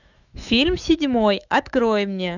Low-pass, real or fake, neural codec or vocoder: 7.2 kHz; fake; autoencoder, 48 kHz, 128 numbers a frame, DAC-VAE, trained on Japanese speech